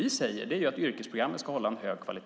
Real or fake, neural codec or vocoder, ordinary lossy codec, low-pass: real; none; none; none